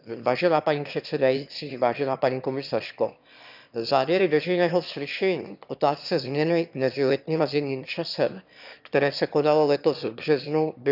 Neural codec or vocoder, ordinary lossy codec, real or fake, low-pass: autoencoder, 22.05 kHz, a latent of 192 numbers a frame, VITS, trained on one speaker; none; fake; 5.4 kHz